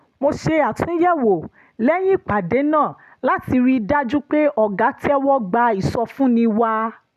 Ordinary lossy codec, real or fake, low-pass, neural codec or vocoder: none; real; 14.4 kHz; none